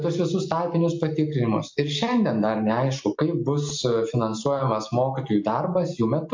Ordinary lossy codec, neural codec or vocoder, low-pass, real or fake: MP3, 48 kbps; none; 7.2 kHz; real